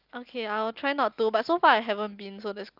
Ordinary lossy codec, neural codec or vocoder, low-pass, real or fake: Opus, 24 kbps; none; 5.4 kHz; real